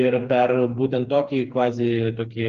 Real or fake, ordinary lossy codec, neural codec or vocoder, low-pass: fake; Opus, 32 kbps; codec, 16 kHz, 4 kbps, FreqCodec, smaller model; 7.2 kHz